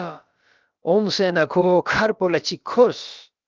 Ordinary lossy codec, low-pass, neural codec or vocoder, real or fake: Opus, 32 kbps; 7.2 kHz; codec, 16 kHz, about 1 kbps, DyCAST, with the encoder's durations; fake